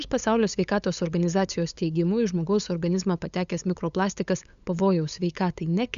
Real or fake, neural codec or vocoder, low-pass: fake; codec, 16 kHz, 8 kbps, FunCodec, trained on LibriTTS, 25 frames a second; 7.2 kHz